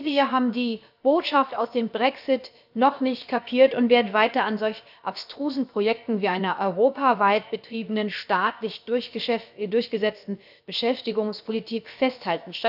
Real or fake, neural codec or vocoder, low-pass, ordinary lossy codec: fake; codec, 16 kHz, about 1 kbps, DyCAST, with the encoder's durations; 5.4 kHz; none